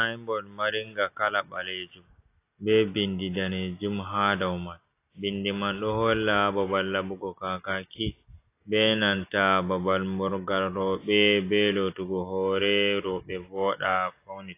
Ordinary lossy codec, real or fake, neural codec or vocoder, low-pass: AAC, 24 kbps; real; none; 3.6 kHz